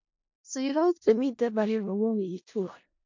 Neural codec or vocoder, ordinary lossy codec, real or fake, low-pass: codec, 16 kHz in and 24 kHz out, 0.4 kbps, LongCat-Audio-Codec, four codebook decoder; MP3, 48 kbps; fake; 7.2 kHz